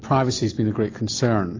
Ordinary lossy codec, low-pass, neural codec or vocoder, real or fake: AAC, 32 kbps; 7.2 kHz; none; real